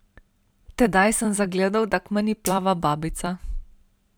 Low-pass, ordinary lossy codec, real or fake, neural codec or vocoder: none; none; fake; vocoder, 44.1 kHz, 128 mel bands every 256 samples, BigVGAN v2